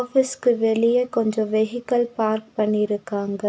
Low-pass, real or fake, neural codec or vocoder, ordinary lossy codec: none; real; none; none